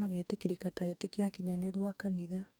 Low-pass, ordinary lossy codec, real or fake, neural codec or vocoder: none; none; fake; codec, 44.1 kHz, 2.6 kbps, DAC